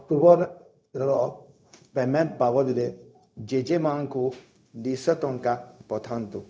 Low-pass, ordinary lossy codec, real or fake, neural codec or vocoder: none; none; fake; codec, 16 kHz, 0.4 kbps, LongCat-Audio-Codec